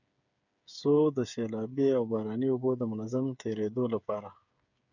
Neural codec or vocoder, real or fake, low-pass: codec, 16 kHz, 8 kbps, FreqCodec, smaller model; fake; 7.2 kHz